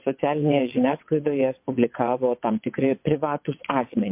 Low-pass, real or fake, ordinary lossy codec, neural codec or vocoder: 3.6 kHz; fake; MP3, 32 kbps; vocoder, 22.05 kHz, 80 mel bands, WaveNeXt